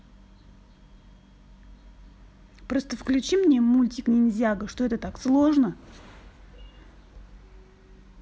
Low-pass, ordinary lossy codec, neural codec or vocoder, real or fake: none; none; none; real